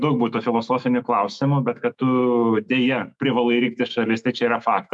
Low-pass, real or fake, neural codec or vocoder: 10.8 kHz; fake; autoencoder, 48 kHz, 128 numbers a frame, DAC-VAE, trained on Japanese speech